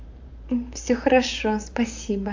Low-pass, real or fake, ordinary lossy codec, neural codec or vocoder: 7.2 kHz; fake; AAC, 48 kbps; vocoder, 44.1 kHz, 128 mel bands, Pupu-Vocoder